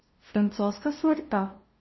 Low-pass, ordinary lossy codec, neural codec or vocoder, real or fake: 7.2 kHz; MP3, 24 kbps; codec, 16 kHz, 0.5 kbps, FunCodec, trained on LibriTTS, 25 frames a second; fake